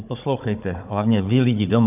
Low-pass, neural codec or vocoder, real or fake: 3.6 kHz; codec, 16 kHz, 16 kbps, FunCodec, trained on Chinese and English, 50 frames a second; fake